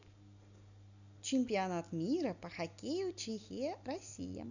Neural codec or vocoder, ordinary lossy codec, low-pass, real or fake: none; none; 7.2 kHz; real